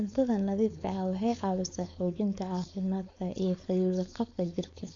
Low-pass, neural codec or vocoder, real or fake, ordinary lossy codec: 7.2 kHz; codec, 16 kHz, 4.8 kbps, FACodec; fake; AAC, 48 kbps